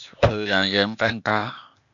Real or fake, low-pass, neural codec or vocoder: fake; 7.2 kHz; codec, 16 kHz, 0.8 kbps, ZipCodec